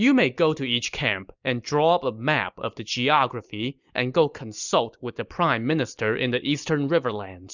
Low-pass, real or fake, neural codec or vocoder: 7.2 kHz; real; none